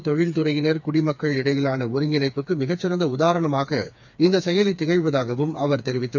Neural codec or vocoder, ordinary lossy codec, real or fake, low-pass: codec, 16 kHz, 4 kbps, FreqCodec, smaller model; none; fake; 7.2 kHz